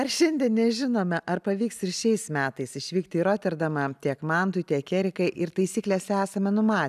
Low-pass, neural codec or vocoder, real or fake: 14.4 kHz; none; real